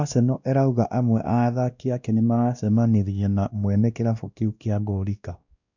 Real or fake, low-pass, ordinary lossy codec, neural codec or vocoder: fake; 7.2 kHz; none; codec, 16 kHz, 1 kbps, X-Codec, WavLM features, trained on Multilingual LibriSpeech